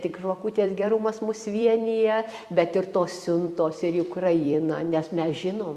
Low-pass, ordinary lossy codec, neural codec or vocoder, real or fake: 14.4 kHz; Opus, 64 kbps; vocoder, 44.1 kHz, 128 mel bands every 256 samples, BigVGAN v2; fake